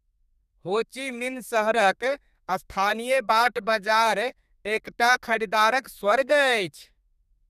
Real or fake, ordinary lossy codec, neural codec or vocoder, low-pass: fake; none; codec, 32 kHz, 1.9 kbps, SNAC; 14.4 kHz